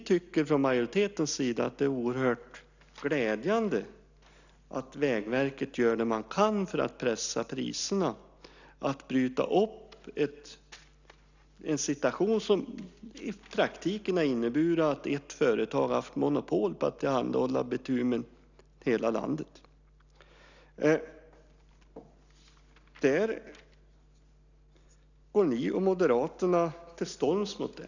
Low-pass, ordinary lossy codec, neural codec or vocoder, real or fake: 7.2 kHz; none; none; real